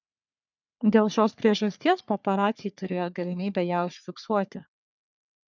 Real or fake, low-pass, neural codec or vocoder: fake; 7.2 kHz; codec, 44.1 kHz, 3.4 kbps, Pupu-Codec